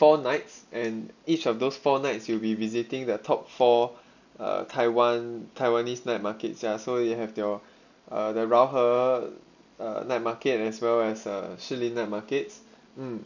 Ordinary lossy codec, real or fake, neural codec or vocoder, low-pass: none; real; none; 7.2 kHz